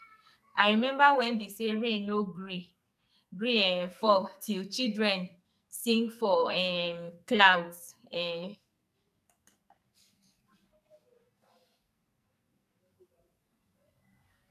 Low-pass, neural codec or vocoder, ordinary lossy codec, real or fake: 14.4 kHz; codec, 44.1 kHz, 2.6 kbps, SNAC; none; fake